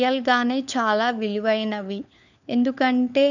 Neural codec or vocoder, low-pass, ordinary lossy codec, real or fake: codec, 16 kHz, 4 kbps, FunCodec, trained on LibriTTS, 50 frames a second; 7.2 kHz; none; fake